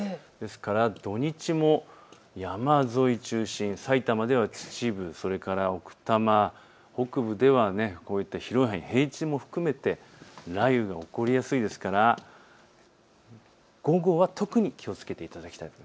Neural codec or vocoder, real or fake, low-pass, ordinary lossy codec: none; real; none; none